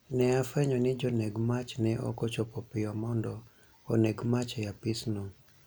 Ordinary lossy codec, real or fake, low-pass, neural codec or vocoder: none; real; none; none